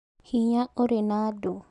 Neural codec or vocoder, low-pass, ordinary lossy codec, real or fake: none; 10.8 kHz; none; real